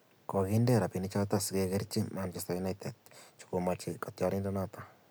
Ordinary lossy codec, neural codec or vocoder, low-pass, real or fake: none; none; none; real